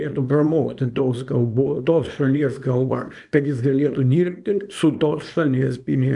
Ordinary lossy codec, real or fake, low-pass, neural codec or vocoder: MP3, 96 kbps; fake; 10.8 kHz; codec, 24 kHz, 0.9 kbps, WavTokenizer, small release